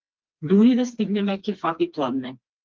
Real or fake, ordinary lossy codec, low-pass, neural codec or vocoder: fake; Opus, 32 kbps; 7.2 kHz; codec, 16 kHz, 2 kbps, FreqCodec, smaller model